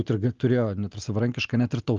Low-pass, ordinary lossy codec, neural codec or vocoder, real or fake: 7.2 kHz; Opus, 32 kbps; none; real